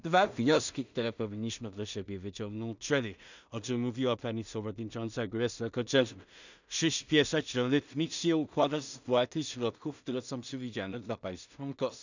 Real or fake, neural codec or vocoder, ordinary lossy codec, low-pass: fake; codec, 16 kHz in and 24 kHz out, 0.4 kbps, LongCat-Audio-Codec, two codebook decoder; none; 7.2 kHz